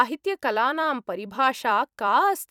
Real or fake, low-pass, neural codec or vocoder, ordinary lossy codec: fake; 19.8 kHz; vocoder, 44.1 kHz, 128 mel bands every 256 samples, BigVGAN v2; none